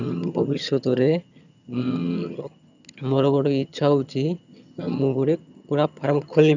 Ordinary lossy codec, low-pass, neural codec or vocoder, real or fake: none; 7.2 kHz; vocoder, 22.05 kHz, 80 mel bands, HiFi-GAN; fake